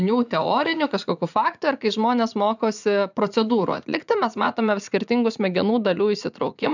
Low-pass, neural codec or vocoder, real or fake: 7.2 kHz; vocoder, 44.1 kHz, 80 mel bands, Vocos; fake